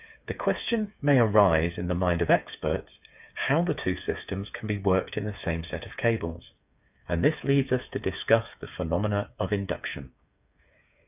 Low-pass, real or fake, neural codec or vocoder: 3.6 kHz; fake; codec, 16 kHz, 8 kbps, FreqCodec, smaller model